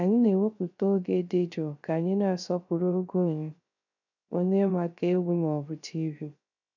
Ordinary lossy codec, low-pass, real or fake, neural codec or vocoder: none; 7.2 kHz; fake; codec, 16 kHz, 0.3 kbps, FocalCodec